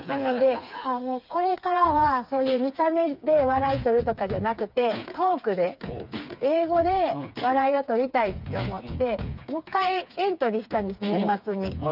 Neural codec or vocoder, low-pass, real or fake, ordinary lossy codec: codec, 16 kHz, 4 kbps, FreqCodec, smaller model; 5.4 kHz; fake; none